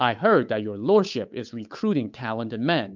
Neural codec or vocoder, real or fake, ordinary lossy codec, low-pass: codec, 16 kHz, 8 kbps, FunCodec, trained on Chinese and English, 25 frames a second; fake; MP3, 64 kbps; 7.2 kHz